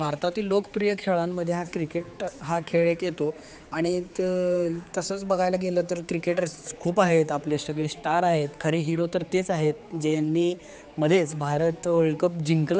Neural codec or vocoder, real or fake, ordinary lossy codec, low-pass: codec, 16 kHz, 4 kbps, X-Codec, HuBERT features, trained on general audio; fake; none; none